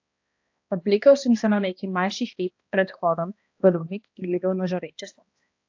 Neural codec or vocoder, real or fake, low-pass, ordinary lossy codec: codec, 16 kHz, 1 kbps, X-Codec, HuBERT features, trained on balanced general audio; fake; 7.2 kHz; AAC, 48 kbps